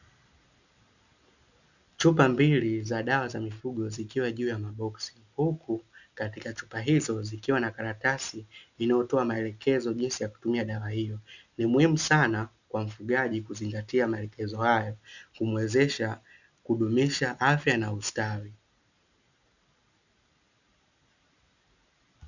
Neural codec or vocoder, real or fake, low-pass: none; real; 7.2 kHz